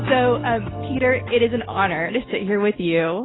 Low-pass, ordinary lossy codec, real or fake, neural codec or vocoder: 7.2 kHz; AAC, 16 kbps; real; none